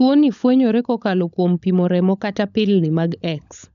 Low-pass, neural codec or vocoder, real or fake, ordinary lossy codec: 7.2 kHz; codec, 16 kHz, 16 kbps, FunCodec, trained on LibriTTS, 50 frames a second; fake; none